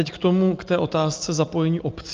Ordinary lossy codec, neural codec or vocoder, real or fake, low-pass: Opus, 24 kbps; none; real; 7.2 kHz